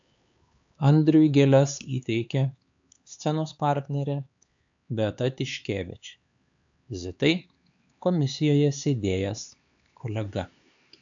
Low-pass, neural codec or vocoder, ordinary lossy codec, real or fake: 7.2 kHz; codec, 16 kHz, 4 kbps, X-Codec, HuBERT features, trained on LibriSpeech; AAC, 64 kbps; fake